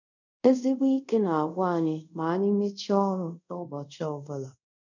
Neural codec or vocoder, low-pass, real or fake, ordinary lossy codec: codec, 24 kHz, 0.5 kbps, DualCodec; 7.2 kHz; fake; none